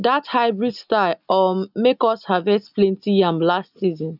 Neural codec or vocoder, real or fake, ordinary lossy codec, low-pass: none; real; none; 5.4 kHz